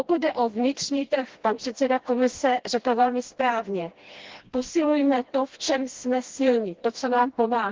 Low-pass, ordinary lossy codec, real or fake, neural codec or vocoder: 7.2 kHz; Opus, 16 kbps; fake; codec, 16 kHz, 1 kbps, FreqCodec, smaller model